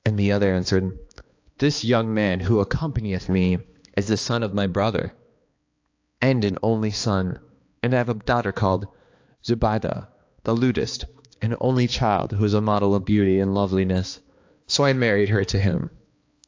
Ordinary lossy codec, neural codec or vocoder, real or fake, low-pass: AAC, 48 kbps; codec, 16 kHz, 2 kbps, X-Codec, HuBERT features, trained on balanced general audio; fake; 7.2 kHz